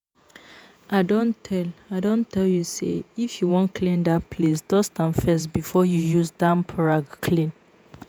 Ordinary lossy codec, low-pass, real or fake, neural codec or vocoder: none; none; fake; vocoder, 48 kHz, 128 mel bands, Vocos